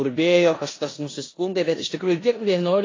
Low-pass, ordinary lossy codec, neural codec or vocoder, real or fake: 7.2 kHz; AAC, 32 kbps; codec, 16 kHz in and 24 kHz out, 0.9 kbps, LongCat-Audio-Codec, four codebook decoder; fake